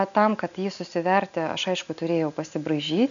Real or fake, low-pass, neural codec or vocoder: real; 7.2 kHz; none